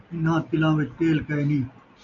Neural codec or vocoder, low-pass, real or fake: none; 7.2 kHz; real